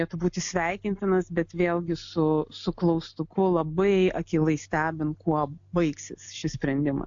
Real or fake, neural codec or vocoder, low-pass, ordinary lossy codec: real; none; 7.2 kHz; AAC, 64 kbps